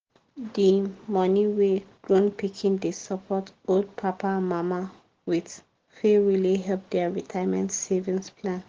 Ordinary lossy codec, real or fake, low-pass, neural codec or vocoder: Opus, 16 kbps; real; 7.2 kHz; none